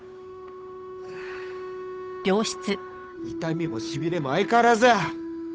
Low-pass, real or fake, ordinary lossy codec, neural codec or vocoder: none; fake; none; codec, 16 kHz, 8 kbps, FunCodec, trained on Chinese and English, 25 frames a second